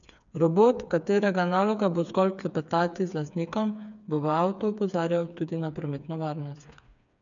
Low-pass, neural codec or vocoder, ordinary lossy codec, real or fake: 7.2 kHz; codec, 16 kHz, 4 kbps, FreqCodec, smaller model; none; fake